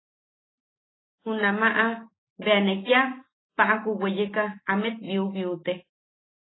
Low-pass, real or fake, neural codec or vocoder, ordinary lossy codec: 7.2 kHz; real; none; AAC, 16 kbps